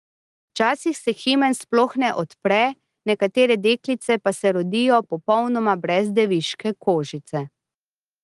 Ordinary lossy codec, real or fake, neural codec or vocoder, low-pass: Opus, 24 kbps; real; none; 10.8 kHz